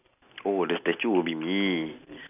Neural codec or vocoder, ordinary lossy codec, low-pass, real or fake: none; none; 3.6 kHz; real